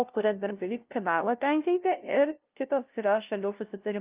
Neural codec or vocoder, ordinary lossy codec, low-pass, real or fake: codec, 16 kHz, 0.5 kbps, FunCodec, trained on LibriTTS, 25 frames a second; Opus, 24 kbps; 3.6 kHz; fake